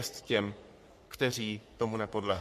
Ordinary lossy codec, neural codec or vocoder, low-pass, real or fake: MP3, 64 kbps; codec, 44.1 kHz, 3.4 kbps, Pupu-Codec; 14.4 kHz; fake